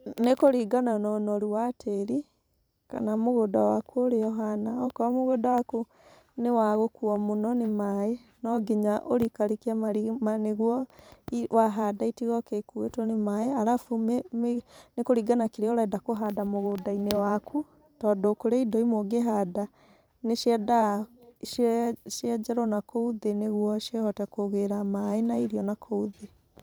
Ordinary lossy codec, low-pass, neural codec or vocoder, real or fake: none; none; vocoder, 44.1 kHz, 128 mel bands every 512 samples, BigVGAN v2; fake